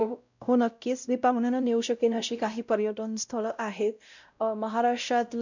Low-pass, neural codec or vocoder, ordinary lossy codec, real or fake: 7.2 kHz; codec, 16 kHz, 0.5 kbps, X-Codec, WavLM features, trained on Multilingual LibriSpeech; none; fake